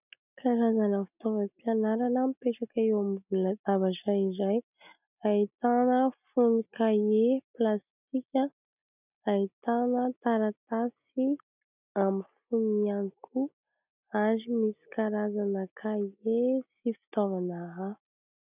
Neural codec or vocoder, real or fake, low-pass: none; real; 3.6 kHz